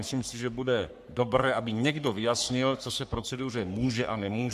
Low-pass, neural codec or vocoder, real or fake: 14.4 kHz; codec, 44.1 kHz, 3.4 kbps, Pupu-Codec; fake